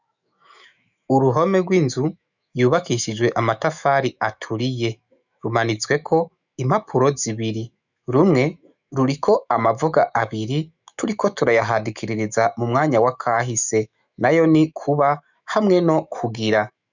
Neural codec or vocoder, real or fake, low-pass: autoencoder, 48 kHz, 128 numbers a frame, DAC-VAE, trained on Japanese speech; fake; 7.2 kHz